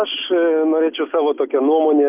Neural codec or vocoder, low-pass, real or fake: none; 3.6 kHz; real